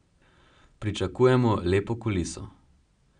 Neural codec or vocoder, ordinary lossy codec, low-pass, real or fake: none; none; 9.9 kHz; real